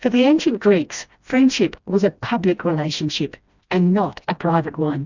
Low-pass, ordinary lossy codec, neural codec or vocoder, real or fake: 7.2 kHz; Opus, 64 kbps; codec, 16 kHz, 1 kbps, FreqCodec, smaller model; fake